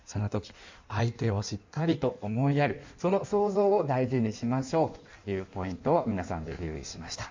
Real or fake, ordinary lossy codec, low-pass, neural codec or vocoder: fake; MP3, 64 kbps; 7.2 kHz; codec, 16 kHz in and 24 kHz out, 1.1 kbps, FireRedTTS-2 codec